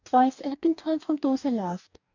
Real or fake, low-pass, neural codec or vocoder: fake; 7.2 kHz; codec, 44.1 kHz, 2.6 kbps, DAC